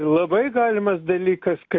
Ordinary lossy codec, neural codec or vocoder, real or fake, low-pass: AAC, 48 kbps; none; real; 7.2 kHz